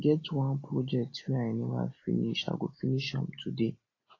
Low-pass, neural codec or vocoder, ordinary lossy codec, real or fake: 7.2 kHz; none; AAC, 32 kbps; real